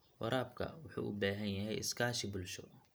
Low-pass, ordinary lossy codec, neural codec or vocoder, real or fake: none; none; none; real